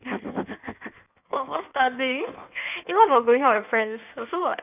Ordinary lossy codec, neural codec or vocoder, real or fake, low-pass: none; codec, 16 kHz in and 24 kHz out, 1.1 kbps, FireRedTTS-2 codec; fake; 3.6 kHz